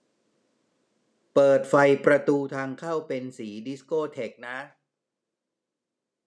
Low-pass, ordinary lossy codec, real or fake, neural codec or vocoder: none; none; real; none